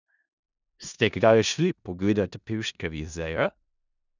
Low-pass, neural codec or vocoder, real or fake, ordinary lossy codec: 7.2 kHz; codec, 16 kHz in and 24 kHz out, 0.4 kbps, LongCat-Audio-Codec, four codebook decoder; fake; none